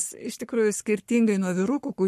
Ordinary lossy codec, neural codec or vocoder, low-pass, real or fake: MP3, 64 kbps; codec, 44.1 kHz, 7.8 kbps, Pupu-Codec; 14.4 kHz; fake